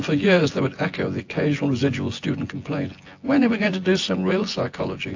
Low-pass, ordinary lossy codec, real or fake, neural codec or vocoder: 7.2 kHz; AAC, 48 kbps; fake; vocoder, 24 kHz, 100 mel bands, Vocos